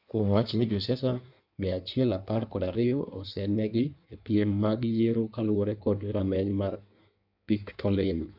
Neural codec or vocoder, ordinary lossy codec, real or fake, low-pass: codec, 16 kHz in and 24 kHz out, 1.1 kbps, FireRedTTS-2 codec; none; fake; 5.4 kHz